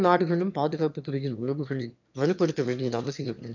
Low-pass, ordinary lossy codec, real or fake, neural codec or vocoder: 7.2 kHz; none; fake; autoencoder, 22.05 kHz, a latent of 192 numbers a frame, VITS, trained on one speaker